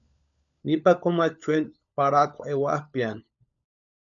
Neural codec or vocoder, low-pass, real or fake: codec, 16 kHz, 16 kbps, FunCodec, trained on LibriTTS, 50 frames a second; 7.2 kHz; fake